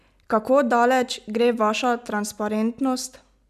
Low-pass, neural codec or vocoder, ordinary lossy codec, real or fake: 14.4 kHz; none; none; real